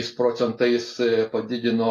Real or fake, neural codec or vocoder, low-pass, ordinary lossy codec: real; none; 14.4 kHz; AAC, 64 kbps